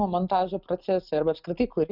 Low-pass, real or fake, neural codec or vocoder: 5.4 kHz; real; none